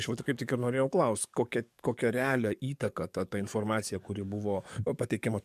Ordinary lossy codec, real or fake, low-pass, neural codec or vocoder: MP3, 96 kbps; fake; 14.4 kHz; codec, 44.1 kHz, 7.8 kbps, DAC